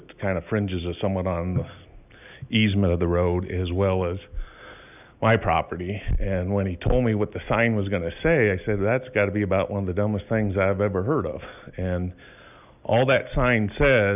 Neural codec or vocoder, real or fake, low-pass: none; real; 3.6 kHz